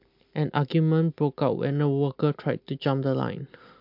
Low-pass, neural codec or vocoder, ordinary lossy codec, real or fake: 5.4 kHz; none; MP3, 48 kbps; real